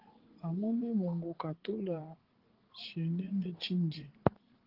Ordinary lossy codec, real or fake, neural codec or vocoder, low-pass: Opus, 16 kbps; fake; vocoder, 44.1 kHz, 80 mel bands, Vocos; 5.4 kHz